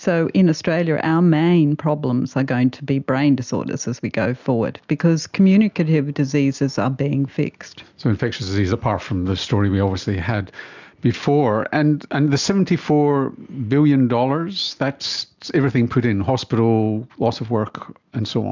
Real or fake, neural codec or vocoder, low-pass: real; none; 7.2 kHz